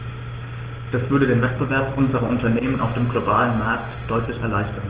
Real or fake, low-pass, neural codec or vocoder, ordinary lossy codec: real; 3.6 kHz; none; Opus, 16 kbps